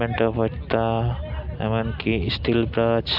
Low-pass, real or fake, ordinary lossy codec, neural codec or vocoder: 5.4 kHz; real; AAC, 48 kbps; none